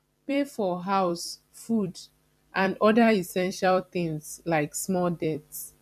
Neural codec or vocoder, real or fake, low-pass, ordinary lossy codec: vocoder, 44.1 kHz, 128 mel bands every 256 samples, BigVGAN v2; fake; 14.4 kHz; none